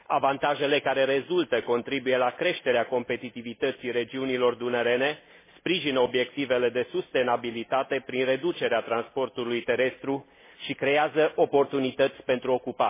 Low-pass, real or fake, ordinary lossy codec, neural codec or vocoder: 3.6 kHz; real; MP3, 16 kbps; none